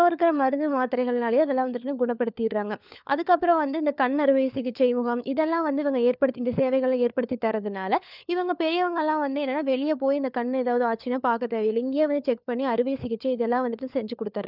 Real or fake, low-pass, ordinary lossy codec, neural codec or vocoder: fake; 5.4 kHz; none; codec, 16 kHz, 4 kbps, FunCodec, trained on LibriTTS, 50 frames a second